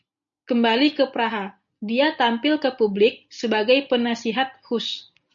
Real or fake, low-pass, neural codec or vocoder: real; 7.2 kHz; none